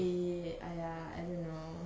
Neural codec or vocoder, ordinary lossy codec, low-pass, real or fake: none; none; none; real